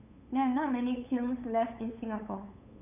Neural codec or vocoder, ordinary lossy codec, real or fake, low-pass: codec, 16 kHz, 8 kbps, FunCodec, trained on LibriTTS, 25 frames a second; none; fake; 3.6 kHz